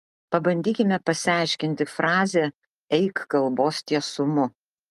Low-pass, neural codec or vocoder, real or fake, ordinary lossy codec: 14.4 kHz; none; real; Opus, 32 kbps